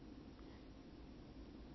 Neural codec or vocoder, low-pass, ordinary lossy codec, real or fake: none; 7.2 kHz; MP3, 24 kbps; real